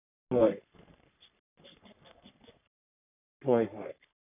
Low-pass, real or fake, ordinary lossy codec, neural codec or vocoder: 3.6 kHz; fake; none; codec, 44.1 kHz, 3.4 kbps, Pupu-Codec